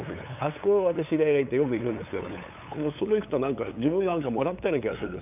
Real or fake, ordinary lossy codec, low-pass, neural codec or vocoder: fake; none; 3.6 kHz; codec, 16 kHz, 8 kbps, FunCodec, trained on LibriTTS, 25 frames a second